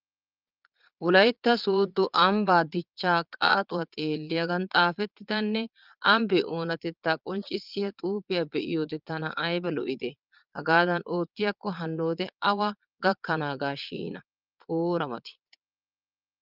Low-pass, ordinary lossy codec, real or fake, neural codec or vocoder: 5.4 kHz; Opus, 24 kbps; fake; vocoder, 22.05 kHz, 80 mel bands, Vocos